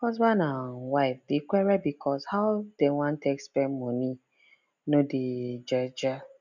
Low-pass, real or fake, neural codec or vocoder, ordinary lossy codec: 7.2 kHz; real; none; none